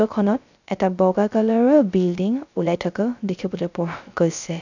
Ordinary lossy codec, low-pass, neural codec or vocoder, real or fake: none; 7.2 kHz; codec, 16 kHz, 0.3 kbps, FocalCodec; fake